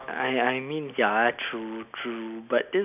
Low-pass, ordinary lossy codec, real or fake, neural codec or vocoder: 3.6 kHz; none; real; none